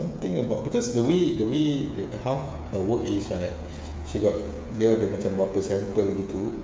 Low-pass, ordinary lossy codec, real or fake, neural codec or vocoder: none; none; fake; codec, 16 kHz, 8 kbps, FreqCodec, smaller model